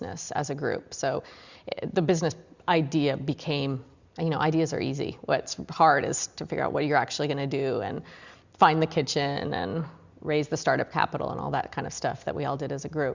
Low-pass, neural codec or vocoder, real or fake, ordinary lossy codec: 7.2 kHz; none; real; Opus, 64 kbps